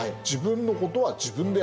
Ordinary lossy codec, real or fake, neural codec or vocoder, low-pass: none; real; none; none